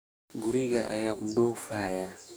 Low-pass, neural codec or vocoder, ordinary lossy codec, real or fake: none; codec, 44.1 kHz, 2.6 kbps, DAC; none; fake